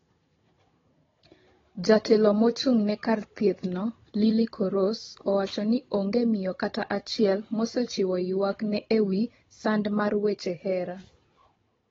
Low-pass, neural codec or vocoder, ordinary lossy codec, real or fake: 7.2 kHz; codec, 16 kHz, 16 kbps, FunCodec, trained on Chinese and English, 50 frames a second; AAC, 24 kbps; fake